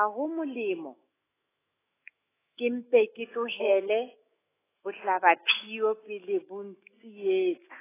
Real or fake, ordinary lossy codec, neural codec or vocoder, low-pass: fake; AAC, 16 kbps; autoencoder, 48 kHz, 128 numbers a frame, DAC-VAE, trained on Japanese speech; 3.6 kHz